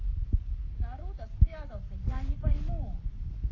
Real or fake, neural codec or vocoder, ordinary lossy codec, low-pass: fake; codec, 44.1 kHz, 7.8 kbps, Pupu-Codec; AAC, 32 kbps; 7.2 kHz